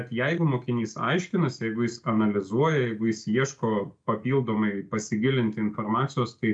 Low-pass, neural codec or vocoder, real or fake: 9.9 kHz; none; real